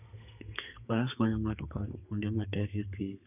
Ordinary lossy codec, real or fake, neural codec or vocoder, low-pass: none; fake; codec, 32 kHz, 1.9 kbps, SNAC; 3.6 kHz